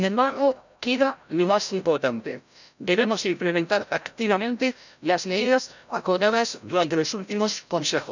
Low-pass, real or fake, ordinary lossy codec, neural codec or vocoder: 7.2 kHz; fake; none; codec, 16 kHz, 0.5 kbps, FreqCodec, larger model